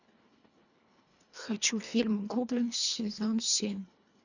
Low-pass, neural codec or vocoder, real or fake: 7.2 kHz; codec, 24 kHz, 1.5 kbps, HILCodec; fake